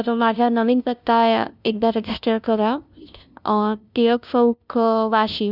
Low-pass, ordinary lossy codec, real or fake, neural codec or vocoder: 5.4 kHz; none; fake; codec, 16 kHz, 0.5 kbps, FunCodec, trained on LibriTTS, 25 frames a second